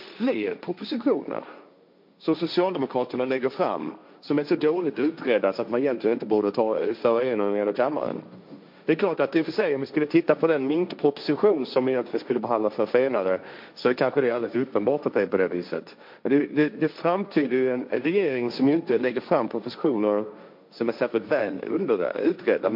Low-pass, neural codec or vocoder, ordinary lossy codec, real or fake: 5.4 kHz; codec, 16 kHz, 1.1 kbps, Voila-Tokenizer; MP3, 48 kbps; fake